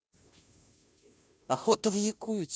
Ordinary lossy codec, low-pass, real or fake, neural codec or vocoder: none; none; fake; codec, 16 kHz, 0.5 kbps, FunCodec, trained on Chinese and English, 25 frames a second